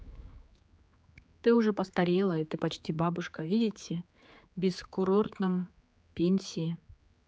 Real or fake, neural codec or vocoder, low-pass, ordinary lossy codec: fake; codec, 16 kHz, 4 kbps, X-Codec, HuBERT features, trained on general audio; none; none